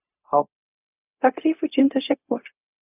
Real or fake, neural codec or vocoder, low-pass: fake; codec, 16 kHz, 0.4 kbps, LongCat-Audio-Codec; 3.6 kHz